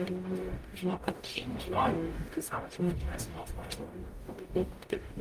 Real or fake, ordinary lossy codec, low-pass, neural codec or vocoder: fake; Opus, 32 kbps; 14.4 kHz; codec, 44.1 kHz, 0.9 kbps, DAC